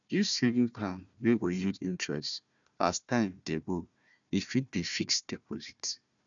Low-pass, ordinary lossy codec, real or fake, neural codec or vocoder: 7.2 kHz; MP3, 96 kbps; fake; codec, 16 kHz, 1 kbps, FunCodec, trained on Chinese and English, 50 frames a second